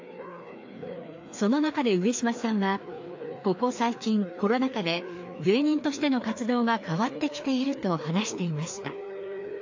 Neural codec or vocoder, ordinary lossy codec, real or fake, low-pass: codec, 16 kHz, 2 kbps, FreqCodec, larger model; AAC, 48 kbps; fake; 7.2 kHz